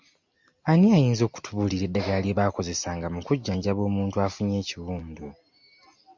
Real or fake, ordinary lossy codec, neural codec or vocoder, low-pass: real; MP3, 64 kbps; none; 7.2 kHz